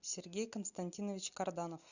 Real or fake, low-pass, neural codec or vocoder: real; 7.2 kHz; none